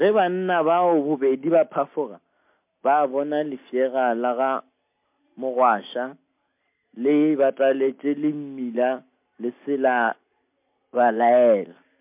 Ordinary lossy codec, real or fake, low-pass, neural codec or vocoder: MP3, 32 kbps; real; 3.6 kHz; none